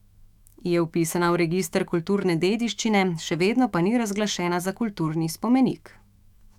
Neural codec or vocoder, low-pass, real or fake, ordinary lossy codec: autoencoder, 48 kHz, 128 numbers a frame, DAC-VAE, trained on Japanese speech; 19.8 kHz; fake; none